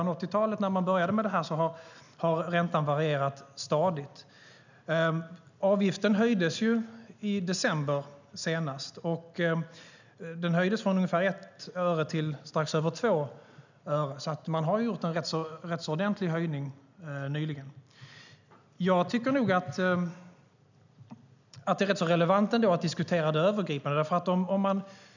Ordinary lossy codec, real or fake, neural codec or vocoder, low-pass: none; real; none; 7.2 kHz